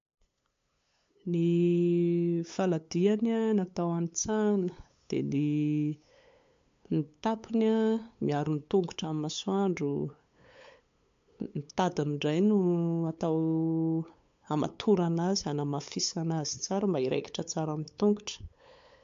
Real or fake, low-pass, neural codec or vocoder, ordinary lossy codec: fake; 7.2 kHz; codec, 16 kHz, 8 kbps, FunCodec, trained on LibriTTS, 25 frames a second; MP3, 48 kbps